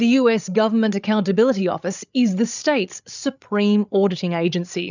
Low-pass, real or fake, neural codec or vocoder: 7.2 kHz; fake; codec, 16 kHz, 8 kbps, FreqCodec, larger model